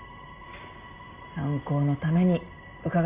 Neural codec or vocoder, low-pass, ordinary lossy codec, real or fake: none; 3.6 kHz; Opus, 24 kbps; real